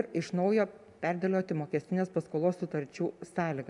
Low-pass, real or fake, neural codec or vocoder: 10.8 kHz; real; none